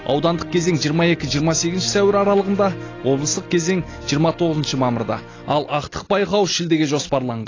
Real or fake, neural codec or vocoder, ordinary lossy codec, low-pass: real; none; AAC, 32 kbps; 7.2 kHz